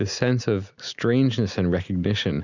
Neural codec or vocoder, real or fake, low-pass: none; real; 7.2 kHz